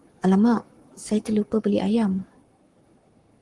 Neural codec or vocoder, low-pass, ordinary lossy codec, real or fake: codec, 44.1 kHz, 7.8 kbps, Pupu-Codec; 10.8 kHz; Opus, 24 kbps; fake